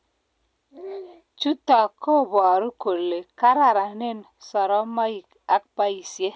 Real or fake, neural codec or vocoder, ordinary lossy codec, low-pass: real; none; none; none